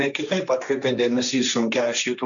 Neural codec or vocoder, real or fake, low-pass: codec, 16 kHz, 1.1 kbps, Voila-Tokenizer; fake; 7.2 kHz